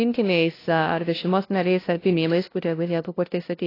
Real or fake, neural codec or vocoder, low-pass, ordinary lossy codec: fake; codec, 16 kHz, 0.5 kbps, FunCodec, trained on LibriTTS, 25 frames a second; 5.4 kHz; AAC, 24 kbps